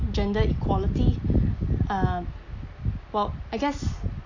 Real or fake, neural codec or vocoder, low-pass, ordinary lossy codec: real; none; 7.2 kHz; AAC, 48 kbps